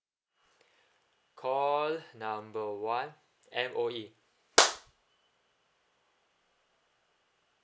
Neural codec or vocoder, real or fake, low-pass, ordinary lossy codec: none; real; none; none